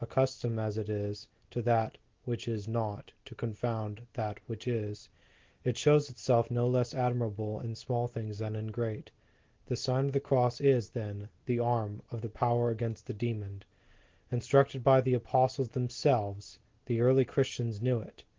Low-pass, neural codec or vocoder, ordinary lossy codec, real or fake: 7.2 kHz; none; Opus, 16 kbps; real